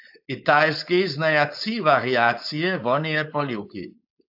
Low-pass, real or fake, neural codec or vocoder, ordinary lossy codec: 5.4 kHz; fake; codec, 16 kHz, 4.8 kbps, FACodec; AAC, 48 kbps